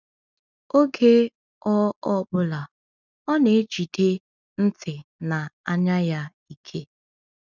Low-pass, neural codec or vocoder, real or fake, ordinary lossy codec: 7.2 kHz; none; real; none